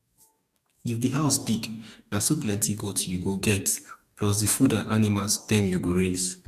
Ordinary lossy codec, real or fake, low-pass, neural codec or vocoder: none; fake; 14.4 kHz; codec, 44.1 kHz, 2.6 kbps, DAC